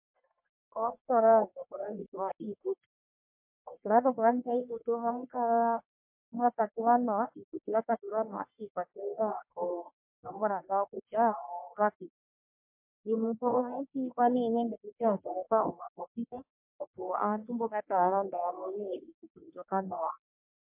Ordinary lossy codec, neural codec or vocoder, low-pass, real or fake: MP3, 32 kbps; codec, 44.1 kHz, 1.7 kbps, Pupu-Codec; 3.6 kHz; fake